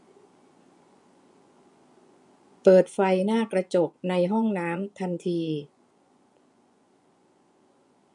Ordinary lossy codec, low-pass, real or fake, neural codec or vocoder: none; 10.8 kHz; fake; vocoder, 24 kHz, 100 mel bands, Vocos